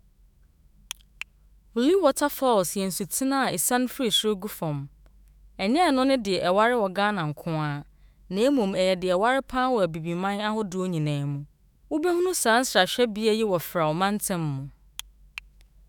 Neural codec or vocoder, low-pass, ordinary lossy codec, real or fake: autoencoder, 48 kHz, 128 numbers a frame, DAC-VAE, trained on Japanese speech; none; none; fake